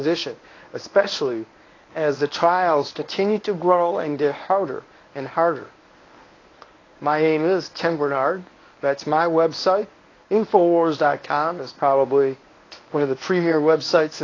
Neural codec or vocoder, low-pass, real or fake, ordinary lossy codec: codec, 24 kHz, 0.9 kbps, WavTokenizer, medium speech release version 1; 7.2 kHz; fake; AAC, 32 kbps